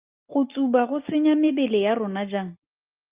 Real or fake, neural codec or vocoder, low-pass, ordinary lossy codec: real; none; 3.6 kHz; Opus, 24 kbps